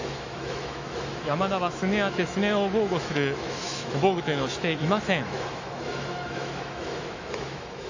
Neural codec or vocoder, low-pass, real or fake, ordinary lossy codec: autoencoder, 48 kHz, 128 numbers a frame, DAC-VAE, trained on Japanese speech; 7.2 kHz; fake; AAC, 48 kbps